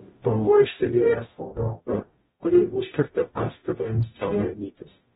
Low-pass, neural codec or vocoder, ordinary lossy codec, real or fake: 19.8 kHz; codec, 44.1 kHz, 0.9 kbps, DAC; AAC, 16 kbps; fake